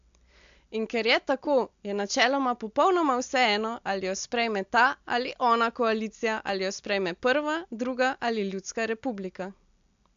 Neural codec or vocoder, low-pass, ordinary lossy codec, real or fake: none; 7.2 kHz; AAC, 64 kbps; real